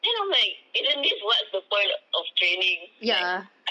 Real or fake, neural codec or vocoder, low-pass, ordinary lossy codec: real; none; none; none